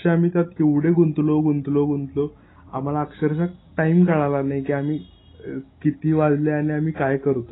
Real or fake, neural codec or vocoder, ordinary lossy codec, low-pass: real; none; AAC, 16 kbps; 7.2 kHz